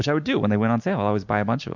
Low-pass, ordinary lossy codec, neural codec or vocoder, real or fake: 7.2 kHz; MP3, 64 kbps; none; real